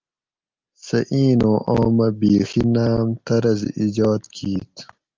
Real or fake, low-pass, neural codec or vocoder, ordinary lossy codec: real; 7.2 kHz; none; Opus, 24 kbps